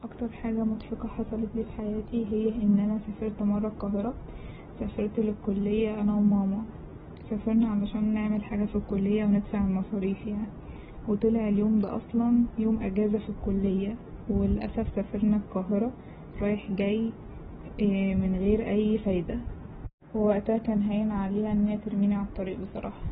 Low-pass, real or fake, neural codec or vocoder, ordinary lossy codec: 19.8 kHz; real; none; AAC, 16 kbps